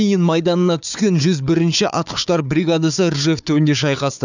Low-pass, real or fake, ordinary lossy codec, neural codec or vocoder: 7.2 kHz; fake; none; codec, 16 kHz, 4 kbps, FunCodec, trained on Chinese and English, 50 frames a second